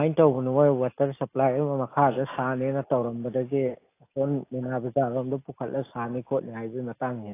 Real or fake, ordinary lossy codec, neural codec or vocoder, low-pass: real; AAC, 24 kbps; none; 3.6 kHz